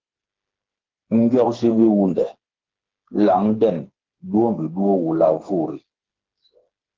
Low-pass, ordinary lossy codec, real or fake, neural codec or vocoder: 7.2 kHz; Opus, 16 kbps; fake; codec, 16 kHz, 4 kbps, FreqCodec, smaller model